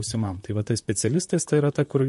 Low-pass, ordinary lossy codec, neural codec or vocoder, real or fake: 14.4 kHz; MP3, 48 kbps; vocoder, 44.1 kHz, 128 mel bands, Pupu-Vocoder; fake